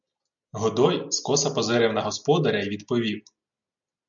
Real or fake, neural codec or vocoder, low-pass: real; none; 7.2 kHz